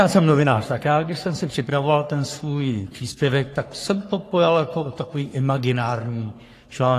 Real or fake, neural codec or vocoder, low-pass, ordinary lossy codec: fake; codec, 44.1 kHz, 3.4 kbps, Pupu-Codec; 14.4 kHz; AAC, 48 kbps